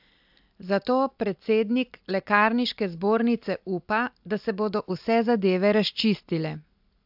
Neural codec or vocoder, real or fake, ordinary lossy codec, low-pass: none; real; AAC, 48 kbps; 5.4 kHz